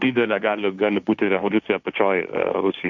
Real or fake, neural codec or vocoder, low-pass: fake; codec, 16 kHz, 1.1 kbps, Voila-Tokenizer; 7.2 kHz